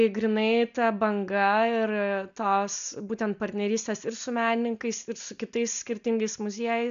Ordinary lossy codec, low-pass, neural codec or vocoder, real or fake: AAC, 96 kbps; 7.2 kHz; none; real